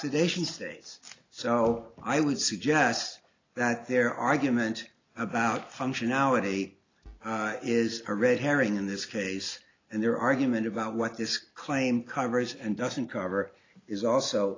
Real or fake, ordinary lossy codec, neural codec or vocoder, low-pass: real; AAC, 32 kbps; none; 7.2 kHz